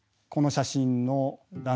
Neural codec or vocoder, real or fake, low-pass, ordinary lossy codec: none; real; none; none